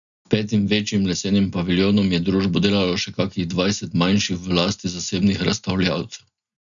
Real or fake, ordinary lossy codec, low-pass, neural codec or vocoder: real; none; 7.2 kHz; none